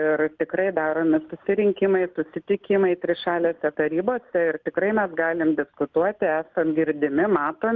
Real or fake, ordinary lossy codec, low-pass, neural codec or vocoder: real; Opus, 32 kbps; 7.2 kHz; none